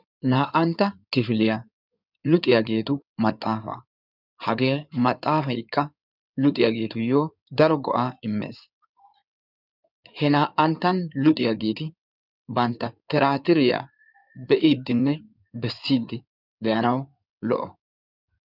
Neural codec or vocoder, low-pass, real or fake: codec, 16 kHz in and 24 kHz out, 2.2 kbps, FireRedTTS-2 codec; 5.4 kHz; fake